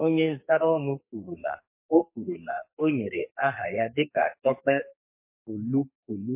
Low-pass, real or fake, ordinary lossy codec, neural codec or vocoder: 3.6 kHz; fake; MP3, 24 kbps; codec, 32 kHz, 1.9 kbps, SNAC